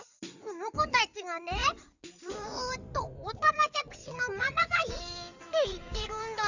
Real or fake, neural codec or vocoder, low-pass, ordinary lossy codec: fake; codec, 16 kHz in and 24 kHz out, 2.2 kbps, FireRedTTS-2 codec; 7.2 kHz; none